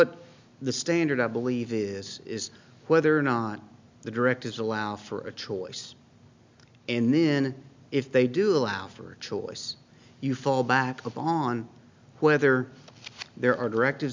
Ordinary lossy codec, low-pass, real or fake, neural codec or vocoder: MP3, 64 kbps; 7.2 kHz; real; none